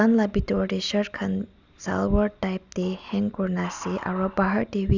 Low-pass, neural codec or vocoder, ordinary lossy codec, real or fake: 7.2 kHz; none; Opus, 64 kbps; real